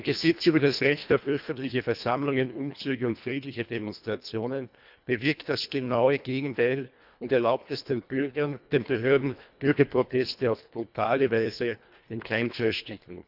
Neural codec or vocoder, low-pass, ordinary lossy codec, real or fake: codec, 24 kHz, 1.5 kbps, HILCodec; 5.4 kHz; none; fake